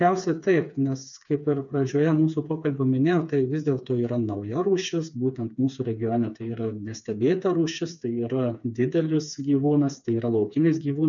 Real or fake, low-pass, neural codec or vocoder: fake; 7.2 kHz; codec, 16 kHz, 4 kbps, FreqCodec, smaller model